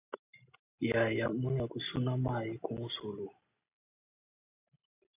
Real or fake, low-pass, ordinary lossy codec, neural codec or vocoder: real; 3.6 kHz; AAC, 24 kbps; none